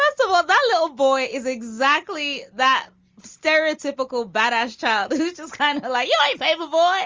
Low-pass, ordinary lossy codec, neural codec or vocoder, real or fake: 7.2 kHz; Opus, 32 kbps; none; real